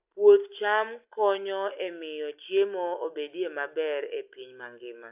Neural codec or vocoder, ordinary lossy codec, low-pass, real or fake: none; AAC, 32 kbps; 3.6 kHz; real